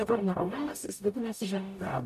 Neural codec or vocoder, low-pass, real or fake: codec, 44.1 kHz, 0.9 kbps, DAC; 14.4 kHz; fake